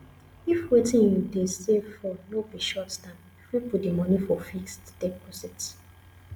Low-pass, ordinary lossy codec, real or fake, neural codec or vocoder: none; none; real; none